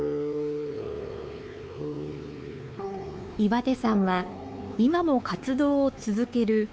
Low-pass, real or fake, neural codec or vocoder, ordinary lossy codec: none; fake; codec, 16 kHz, 4 kbps, X-Codec, WavLM features, trained on Multilingual LibriSpeech; none